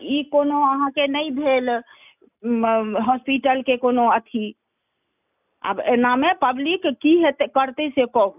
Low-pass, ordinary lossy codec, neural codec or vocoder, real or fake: 3.6 kHz; none; none; real